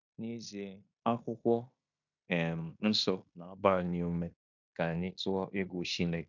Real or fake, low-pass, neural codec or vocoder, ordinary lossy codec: fake; 7.2 kHz; codec, 16 kHz in and 24 kHz out, 0.9 kbps, LongCat-Audio-Codec, fine tuned four codebook decoder; none